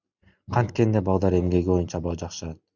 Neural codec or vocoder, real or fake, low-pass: none; real; 7.2 kHz